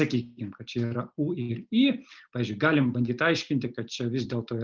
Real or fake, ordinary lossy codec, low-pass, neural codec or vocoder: real; Opus, 24 kbps; 7.2 kHz; none